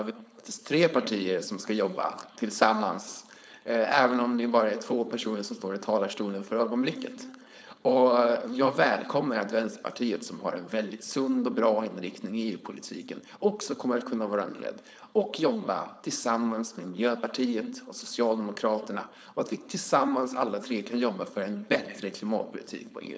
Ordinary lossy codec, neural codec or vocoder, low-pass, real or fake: none; codec, 16 kHz, 4.8 kbps, FACodec; none; fake